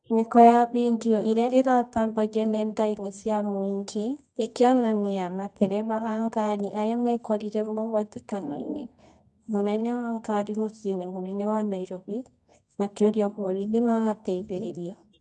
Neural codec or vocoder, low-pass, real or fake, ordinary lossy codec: codec, 24 kHz, 0.9 kbps, WavTokenizer, medium music audio release; 10.8 kHz; fake; none